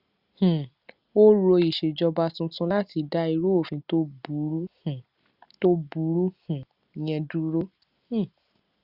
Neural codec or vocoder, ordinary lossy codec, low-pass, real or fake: none; Opus, 64 kbps; 5.4 kHz; real